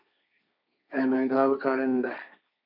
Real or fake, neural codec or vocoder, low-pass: fake; codec, 16 kHz, 1.1 kbps, Voila-Tokenizer; 5.4 kHz